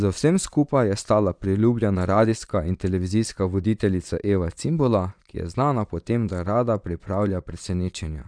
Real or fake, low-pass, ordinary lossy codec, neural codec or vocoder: fake; 9.9 kHz; none; vocoder, 22.05 kHz, 80 mel bands, Vocos